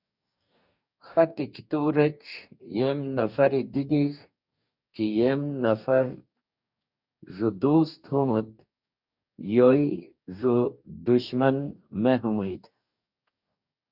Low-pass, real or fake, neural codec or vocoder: 5.4 kHz; fake; codec, 44.1 kHz, 2.6 kbps, DAC